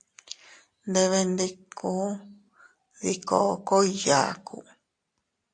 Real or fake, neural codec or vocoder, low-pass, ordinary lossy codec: real; none; 9.9 kHz; AAC, 48 kbps